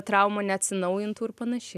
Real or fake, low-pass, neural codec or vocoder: real; 14.4 kHz; none